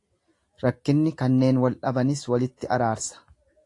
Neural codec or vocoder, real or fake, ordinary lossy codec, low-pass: none; real; AAC, 48 kbps; 10.8 kHz